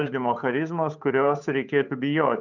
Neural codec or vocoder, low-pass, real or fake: codec, 16 kHz, 8 kbps, FunCodec, trained on Chinese and English, 25 frames a second; 7.2 kHz; fake